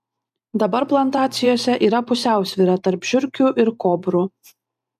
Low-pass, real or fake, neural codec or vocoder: 14.4 kHz; fake; vocoder, 44.1 kHz, 128 mel bands every 512 samples, BigVGAN v2